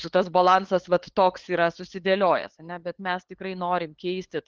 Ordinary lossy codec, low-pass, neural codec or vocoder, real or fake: Opus, 16 kbps; 7.2 kHz; codec, 16 kHz, 8 kbps, FunCodec, trained on LibriTTS, 25 frames a second; fake